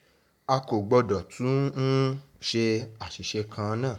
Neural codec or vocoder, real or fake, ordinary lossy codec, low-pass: codec, 44.1 kHz, 7.8 kbps, Pupu-Codec; fake; none; 19.8 kHz